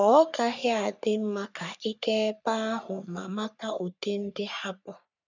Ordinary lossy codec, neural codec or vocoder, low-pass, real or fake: none; codec, 44.1 kHz, 3.4 kbps, Pupu-Codec; 7.2 kHz; fake